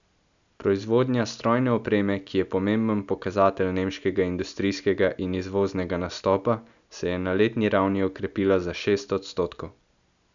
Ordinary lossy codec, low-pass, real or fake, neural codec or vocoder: none; 7.2 kHz; real; none